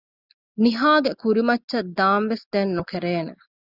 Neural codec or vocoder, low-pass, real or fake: none; 5.4 kHz; real